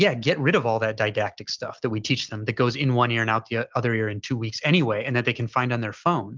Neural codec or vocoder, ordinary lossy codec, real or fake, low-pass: none; Opus, 32 kbps; real; 7.2 kHz